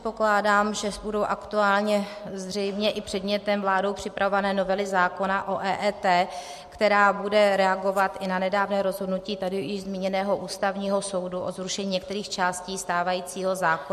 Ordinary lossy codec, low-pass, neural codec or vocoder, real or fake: MP3, 64 kbps; 14.4 kHz; none; real